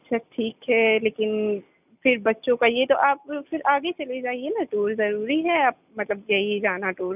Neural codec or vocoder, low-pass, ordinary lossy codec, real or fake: none; 3.6 kHz; none; real